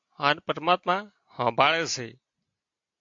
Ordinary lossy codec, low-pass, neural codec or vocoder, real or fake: AAC, 64 kbps; 7.2 kHz; none; real